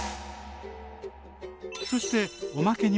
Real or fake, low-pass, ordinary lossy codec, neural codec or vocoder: real; none; none; none